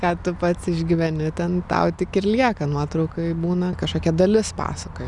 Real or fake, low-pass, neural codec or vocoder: real; 10.8 kHz; none